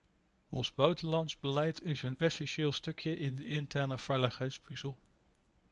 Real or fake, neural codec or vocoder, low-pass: fake; codec, 24 kHz, 0.9 kbps, WavTokenizer, medium speech release version 1; 10.8 kHz